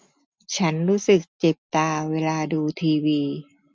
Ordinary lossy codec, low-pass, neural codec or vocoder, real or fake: none; none; none; real